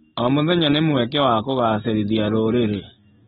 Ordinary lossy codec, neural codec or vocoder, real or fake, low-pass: AAC, 16 kbps; none; real; 19.8 kHz